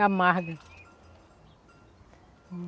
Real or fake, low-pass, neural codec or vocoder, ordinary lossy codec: real; none; none; none